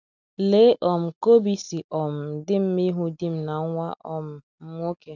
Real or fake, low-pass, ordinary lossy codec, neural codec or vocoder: real; 7.2 kHz; none; none